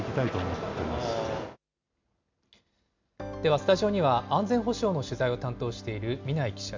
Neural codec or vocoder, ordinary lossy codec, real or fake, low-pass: none; MP3, 64 kbps; real; 7.2 kHz